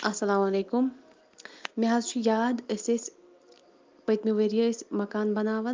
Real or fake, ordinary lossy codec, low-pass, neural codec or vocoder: real; Opus, 32 kbps; 7.2 kHz; none